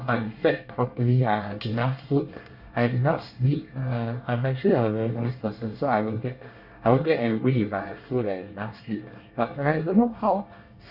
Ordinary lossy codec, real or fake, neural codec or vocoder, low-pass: Opus, 64 kbps; fake; codec, 24 kHz, 1 kbps, SNAC; 5.4 kHz